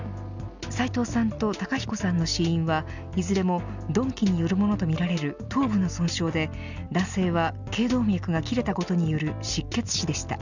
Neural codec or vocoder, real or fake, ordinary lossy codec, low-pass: none; real; none; 7.2 kHz